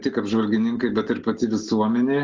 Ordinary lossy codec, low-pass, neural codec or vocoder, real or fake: Opus, 16 kbps; 7.2 kHz; none; real